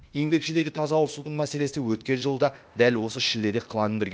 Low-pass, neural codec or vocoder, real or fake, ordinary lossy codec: none; codec, 16 kHz, 0.8 kbps, ZipCodec; fake; none